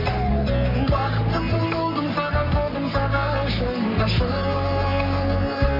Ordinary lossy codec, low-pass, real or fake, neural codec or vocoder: MP3, 32 kbps; 5.4 kHz; fake; codec, 44.1 kHz, 3.4 kbps, Pupu-Codec